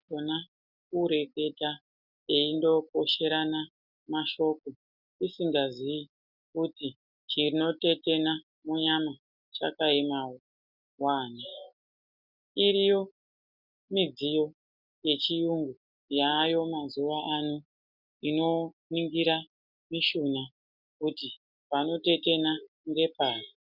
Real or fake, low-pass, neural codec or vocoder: real; 5.4 kHz; none